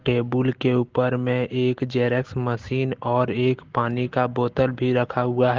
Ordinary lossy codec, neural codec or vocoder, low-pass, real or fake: Opus, 16 kbps; none; 7.2 kHz; real